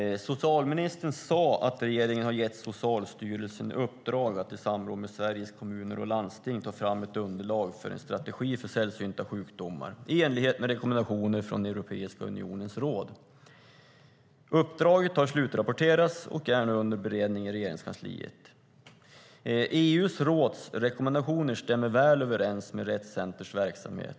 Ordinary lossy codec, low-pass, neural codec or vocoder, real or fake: none; none; none; real